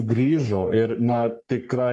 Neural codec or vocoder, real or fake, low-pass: codec, 44.1 kHz, 3.4 kbps, Pupu-Codec; fake; 10.8 kHz